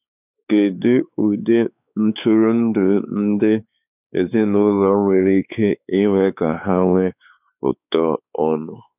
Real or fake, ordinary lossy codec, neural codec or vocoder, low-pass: fake; none; codec, 16 kHz, 4 kbps, X-Codec, WavLM features, trained on Multilingual LibriSpeech; 3.6 kHz